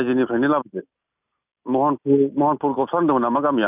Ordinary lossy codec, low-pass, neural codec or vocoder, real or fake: none; 3.6 kHz; none; real